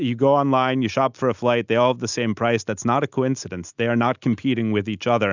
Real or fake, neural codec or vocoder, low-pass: real; none; 7.2 kHz